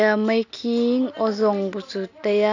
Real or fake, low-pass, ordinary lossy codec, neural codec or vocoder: real; 7.2 kHz; AAC, 48 kbps; none